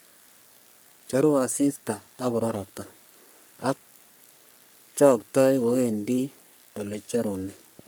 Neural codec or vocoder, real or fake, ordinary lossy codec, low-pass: codec, 44.1 kHz, 3.4 kbps, Pupu-Codec; fake; none; none